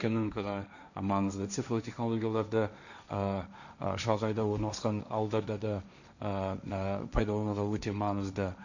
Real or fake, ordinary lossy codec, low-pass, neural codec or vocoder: fake; none; 7.2 kHz; codec, 16 kHz, 1.1 kbps, Voila-Tokenizer